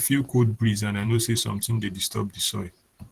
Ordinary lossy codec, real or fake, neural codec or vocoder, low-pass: Opus, 16 kbps; fake; vocoder, 44.1 kHz, 128 mel bands every 512 samples, BigVGAN v2; 14.4 kHz